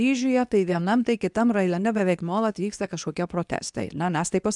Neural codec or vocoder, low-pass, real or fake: codec, 24 kHz, 0.9 kbps, WavTokenizer, medium speech release version 2; 10.8 kHz; fake